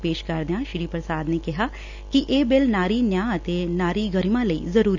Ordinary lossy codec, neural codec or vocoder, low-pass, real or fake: none; none; 7.2 kHz; real